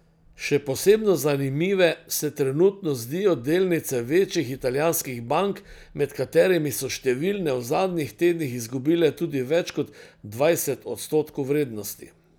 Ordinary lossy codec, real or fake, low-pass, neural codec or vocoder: none; real; none; none